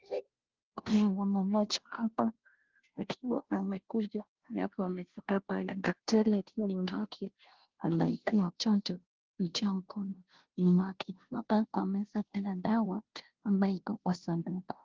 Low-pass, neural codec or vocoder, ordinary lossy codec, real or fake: 7.2 kHz; codec, 16 kHz, 0.5 kbps, FunCodec, trained on Chinese and English, 25 frames a second; Opus, 16 kbps; fake